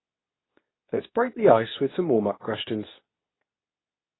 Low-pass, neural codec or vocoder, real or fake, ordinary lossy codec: 7.2 kHz; codec, 24 kHz, 0.9 kbps, WavTokenizer, medium speech release version 2; fake; AAC, 16 kbps